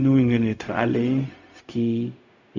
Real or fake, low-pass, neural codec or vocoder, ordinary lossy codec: fake; 7.2 kHz; codec, 16 kHz, 0.4 kbps, LongCat-Audio-Codec; Opus, 64 kbps